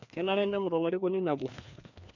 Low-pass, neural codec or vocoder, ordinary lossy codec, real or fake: 7.2 kHz; codec, 16 kHz, 2 kbps, FreqCodec, larger model; none; fake